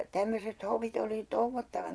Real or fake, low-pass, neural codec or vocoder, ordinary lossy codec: fake; none; vocoder, 22.05 kHz, 80 mel bands, Vocos; none